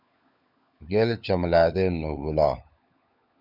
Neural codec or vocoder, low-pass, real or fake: codec, 16 kHz, 16 kbps, FunCodec, trained on LibriTTS, 50 frames a second; 5.4 kHz; fake